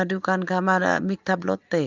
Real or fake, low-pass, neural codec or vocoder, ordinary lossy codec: real; 7.2 kHz; none; Opus, 24 kbps